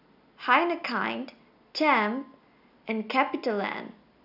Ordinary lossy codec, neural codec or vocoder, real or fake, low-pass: none; none; real; 5.4 kHz